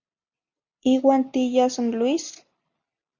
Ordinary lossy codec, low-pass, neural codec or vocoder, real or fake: Opus, 64 kbps; 7.2 kHz; none; real